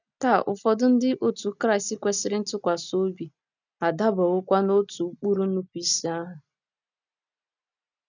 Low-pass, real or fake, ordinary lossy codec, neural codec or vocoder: 7.2 kHz; real; none; none